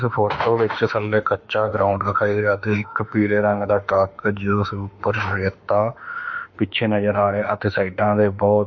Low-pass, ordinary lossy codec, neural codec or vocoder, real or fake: 7.2 kHz; MP3, 48 kbps; autoencoder, 48 kHz, 32 numbers a frame, DAC-VAE, trained on Japanese speech; fake